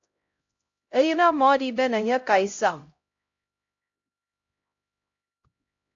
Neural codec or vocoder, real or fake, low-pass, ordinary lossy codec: codec, 16 kHz, 0.5 kbps, X-Codec, HuBERT features, trained on LibriSpeech; fake; 7.2 kHz; AAC, 48 kbps